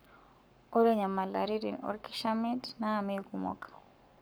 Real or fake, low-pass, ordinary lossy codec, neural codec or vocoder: fake; none; none; codec, 44.1 kHz, 7.8 kbps, Pupu-Codec